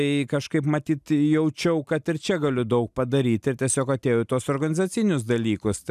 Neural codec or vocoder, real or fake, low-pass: none; real; 14.4 kHz